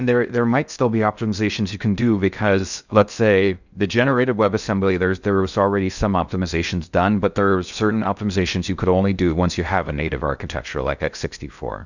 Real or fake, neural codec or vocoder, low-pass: fake; codec, 16 kHz in and 24 kHz out, 0.6 kbps, FocalCodec, streaming, 2048 codes; 7.2 kHz